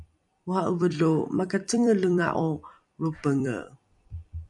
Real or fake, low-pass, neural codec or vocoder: fake; 10.8 kHz; vocoder, 44.1 kHz, 128 mel bands every 256 samples, BigVGAN v2